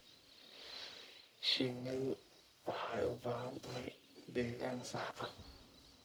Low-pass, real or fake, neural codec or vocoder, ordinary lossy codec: none; fake; codec, 44.1 kHz, 1.7 kbps, Pupu-Codec; none